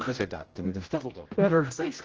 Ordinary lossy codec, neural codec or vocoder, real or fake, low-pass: Opus, 16 kbps; codec, 16 kHz, 0.5 kbps, X-Codec, HuBERT features, trained on general audio; fake; 7.2 kHz